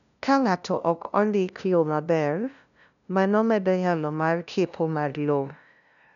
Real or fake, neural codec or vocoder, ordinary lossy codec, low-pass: fake; codec, 16 kHz, 0.5 kbps, FunCodec, trained on LibriTTS, 25 frames a second; none; 7.2 kHz